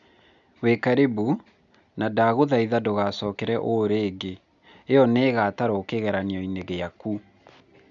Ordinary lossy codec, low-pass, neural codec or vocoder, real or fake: none; 7.2 kHz; none; real